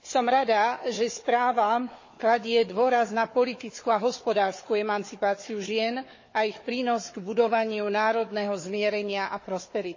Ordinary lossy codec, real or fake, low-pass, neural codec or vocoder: MP3, 32 kbps; fake; 7.2 kHz; codec, 16 kHz, 4 kbps, FunCodec, trained on Chinese and English, 50 frames a second